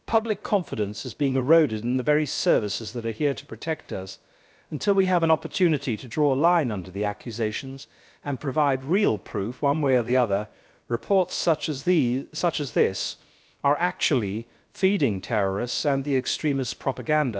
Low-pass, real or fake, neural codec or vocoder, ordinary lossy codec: none; fake; codec, 16 kHz, about 1 kbps, DyCAST, with the encoder's durations; none